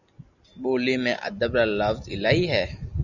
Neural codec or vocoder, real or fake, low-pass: none; real; 7.2 kHz